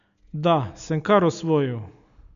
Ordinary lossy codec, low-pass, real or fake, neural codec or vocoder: none; 7.2 kHz; real; none